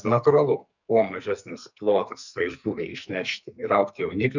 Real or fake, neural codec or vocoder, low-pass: fake; codec, 44.1 kHz, 2.6 kbps, SNAC; 7.2 kHz